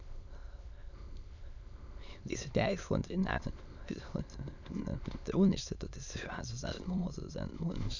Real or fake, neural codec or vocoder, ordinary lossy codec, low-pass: fake; autoencoder, 22.05 kHz, a latent of 192 numbers a frame, VITS, trained on many speakers; none; 7.2 kHz